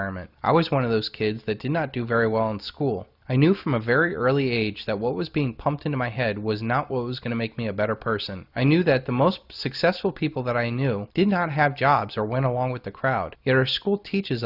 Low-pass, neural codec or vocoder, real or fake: 5.4 kHz; none; real